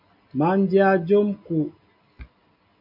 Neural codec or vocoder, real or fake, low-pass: none; real; 5.4 kHz